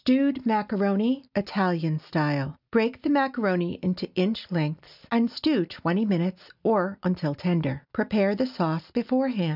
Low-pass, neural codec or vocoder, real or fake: 5.4 kHz; none; real